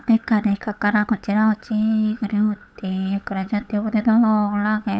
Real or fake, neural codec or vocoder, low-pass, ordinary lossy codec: fake; codec, 16 kHz, 8 kbps, FunCodec, trained on LibriTTS, 25 frames a second; none; none